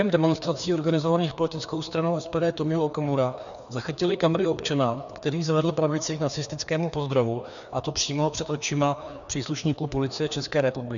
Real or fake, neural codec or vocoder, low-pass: fake; codec, 16 kHz, 2 kbps, FreqCodec, larger model; 7.2 kHz